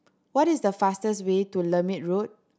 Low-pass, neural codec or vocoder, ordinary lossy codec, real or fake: none; none; none; real